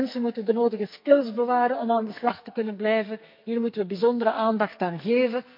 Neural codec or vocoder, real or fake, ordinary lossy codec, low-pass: codec, 44.1 kHz, 2.6 kbps, SNAC; fake; MP3, 48 kbps; 5.4 kHz